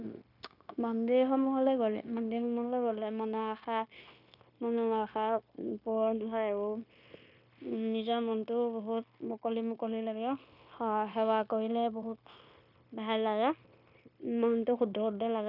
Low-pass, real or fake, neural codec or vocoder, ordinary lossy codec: 5.4 kHz; fake; codec, 16 kHz, 0.9 kbps, LongCat-Audio-Codec; none